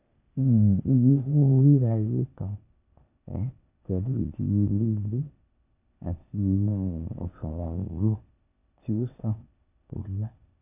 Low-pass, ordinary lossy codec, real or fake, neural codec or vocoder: 3.6 kHz; none; fake; codec, 16 kHz, 0.8 kbps, ZipCodec